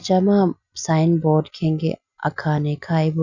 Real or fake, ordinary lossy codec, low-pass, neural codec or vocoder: real; none; 7.2 kHz; none